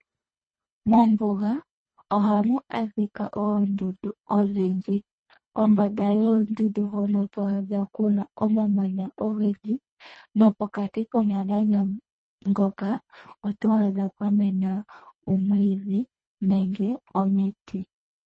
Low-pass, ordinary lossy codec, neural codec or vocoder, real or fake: 9.9 kHz; MP3, 32 kbps; codec, 24 kHz, 1.5 kbps, HILCodec; fake